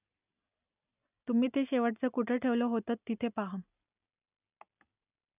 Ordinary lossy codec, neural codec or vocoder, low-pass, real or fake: none; none; 3.6 kHz; real